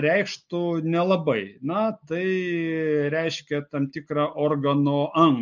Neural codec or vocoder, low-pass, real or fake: none; 7.2 kHz; real